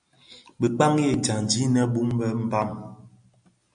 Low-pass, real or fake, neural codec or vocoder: 9.9 kHz; real; none